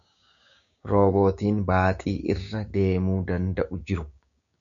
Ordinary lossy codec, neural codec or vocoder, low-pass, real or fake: MP3, 96 kbps; codec, 16 kHz, 6 kbps, DAC; 7.2 kHz; fake